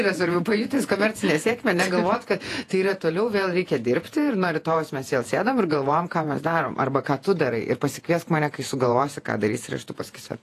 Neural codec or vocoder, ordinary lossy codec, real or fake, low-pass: vocoder, 48 kHz, 128 mel bands, Vocos; AAC, 48 kbps; fake; 14.4 kHz